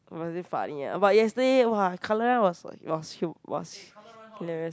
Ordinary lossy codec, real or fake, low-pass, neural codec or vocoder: none; real; none; none